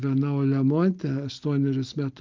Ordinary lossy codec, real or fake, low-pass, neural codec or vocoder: Opus, 24 kbps; real; 7.2 kHz; none